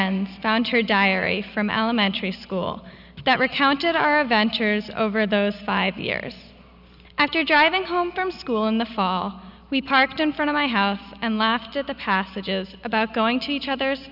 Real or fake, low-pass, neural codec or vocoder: real; 5.4 kHz; none